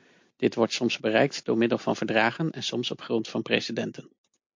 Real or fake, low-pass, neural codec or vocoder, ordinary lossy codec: real; 7.2 kHz; none; MP3, 48 kbps